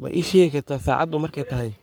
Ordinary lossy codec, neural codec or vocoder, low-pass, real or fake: none; codec, 44.1 kHz, 3.4 kbps, Pupu-Codec; none; fake